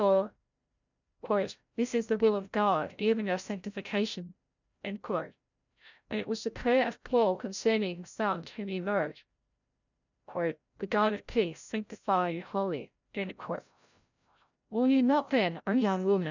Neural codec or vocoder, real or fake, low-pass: codec, 16 kHz, 0.5 kbps, FreqCodec, larger model; fake; 7.2 kHz